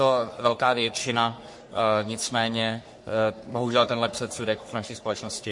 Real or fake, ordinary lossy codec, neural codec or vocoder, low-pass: fake; MP3, 48 kbps; codec, 44.1 kHz, 3.4 kbps, Pupu-Codec; 10.8 kHz